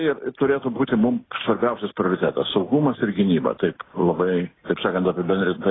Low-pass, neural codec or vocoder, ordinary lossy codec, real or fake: 7.2 kHz; none; AAC, 16 kbps; real